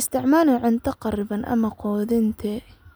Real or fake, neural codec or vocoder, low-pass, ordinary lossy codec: real; none; none; none